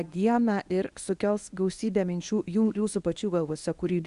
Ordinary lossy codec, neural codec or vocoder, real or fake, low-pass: Opus, 64 kbps; codec, 24 kHz, 0.9 kbps, WavTokenizer, medium speech release version 1; fake; 10.8 kHz